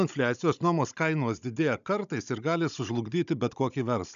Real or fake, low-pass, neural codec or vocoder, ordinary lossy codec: fake; 7.2 kHz; codec, 16 kHz, 16 kbps, FunCodec, trained on Chinese and English, 50 frames a second; MP3, 96 kbps